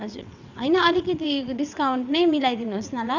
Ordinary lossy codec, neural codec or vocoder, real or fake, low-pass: none; vocoder, 22.05 kHz, 80 mel bands, WaveNeXt; fake; 7.2 kHz